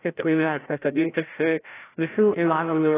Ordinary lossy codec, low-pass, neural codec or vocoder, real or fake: AAC, 16 kbps; 3.6 kHz; codec, 16 kHz, 0.5 kbps, FreqCodec, larger model; fake